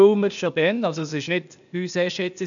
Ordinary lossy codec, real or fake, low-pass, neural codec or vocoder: none; fake; 7.2 kHz; codec, 16 kHz, 0.8 kbps, ZipCodec